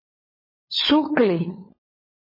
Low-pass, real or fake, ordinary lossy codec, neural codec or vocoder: 5.4 kHz; fake; MP3, 24 kbps; codec, 16 kHz, 16 kbps, FunCodec, trained on LibriTTS, 50 frames a second